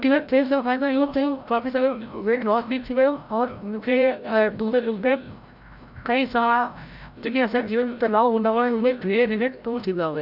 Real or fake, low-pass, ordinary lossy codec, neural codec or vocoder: fake; 5.4 kHz; none; codec, 16 kHz, 0.5 kbps, FreqCodec, larger model